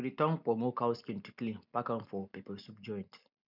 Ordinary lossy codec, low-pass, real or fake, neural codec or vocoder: none; 5.4 kHz; real; none